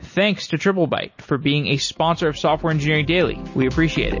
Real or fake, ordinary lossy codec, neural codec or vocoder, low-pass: real; MP3, 32 kbps; none; 7.2 kHz